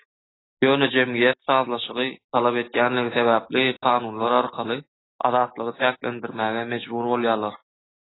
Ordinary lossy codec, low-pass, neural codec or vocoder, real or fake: AAC, 16 kbps; 7.2 kHz; none; real